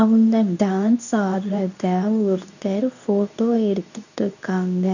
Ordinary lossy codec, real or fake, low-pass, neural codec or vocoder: none; fake; 7.2 kHz; codec, 24 kHz, 0.9 kbps, WavTokenizer, medium speech release version 2